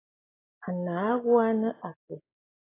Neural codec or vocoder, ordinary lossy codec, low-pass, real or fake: none; AAC, 16 kbps; 3.6 kHz; real